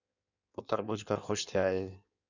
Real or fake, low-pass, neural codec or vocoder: fake; 7.2 kHz; codec, 16 kHz in and 24 kHz out, 1.1 kbps, FireRedTTS-2 codec